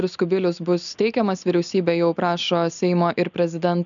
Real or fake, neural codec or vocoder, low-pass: real; none; 7.2 kHz